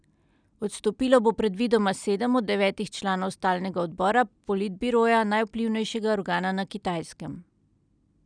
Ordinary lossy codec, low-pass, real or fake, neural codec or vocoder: none; 9.9 kHz; real; none